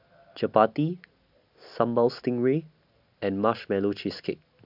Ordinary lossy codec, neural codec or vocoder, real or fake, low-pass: AAC, 48 kbps; none; real; 5.4 kHz